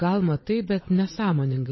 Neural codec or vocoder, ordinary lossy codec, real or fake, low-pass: vocoder, 22.05 kHz, 80 mel bands, Vocos; MP3, 24 kbps; fake; 7.2 kHz